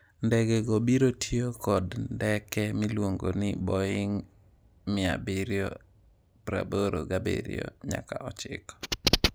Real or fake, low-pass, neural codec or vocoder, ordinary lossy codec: real; none; none; none